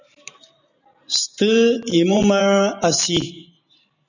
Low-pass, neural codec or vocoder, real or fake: 7.2 kHz; vocoder, 44.1 kHz, 128 mel bands every 512 samples, BigVGAN v2; fake